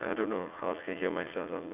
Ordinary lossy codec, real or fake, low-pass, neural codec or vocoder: none; fake; 3.6 kHz; vocoder, 44.1 kHz, 80 mel bands, Vocos